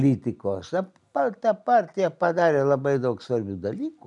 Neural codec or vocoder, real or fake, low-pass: none; real; 10.8 kHz